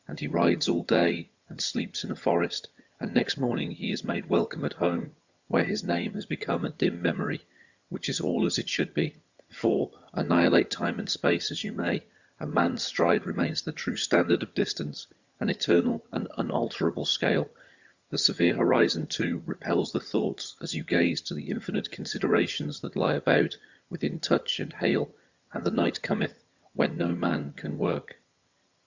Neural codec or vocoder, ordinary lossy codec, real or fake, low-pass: vocoder, 22.05 kHz, 80 mel bands, HiFi-GAN; Opus, 64 kbps; fake; 7.2 kHz